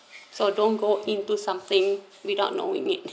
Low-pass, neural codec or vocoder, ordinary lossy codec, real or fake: none; none; none; real